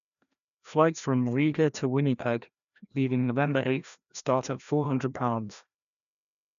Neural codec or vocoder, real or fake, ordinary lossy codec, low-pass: codec, 16 kHz, 1 kbps, FreqCodec, larger model; fake; none; 7.2 kHz